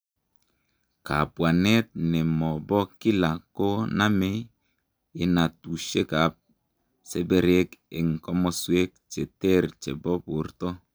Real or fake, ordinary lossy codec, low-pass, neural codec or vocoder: real; none; none; none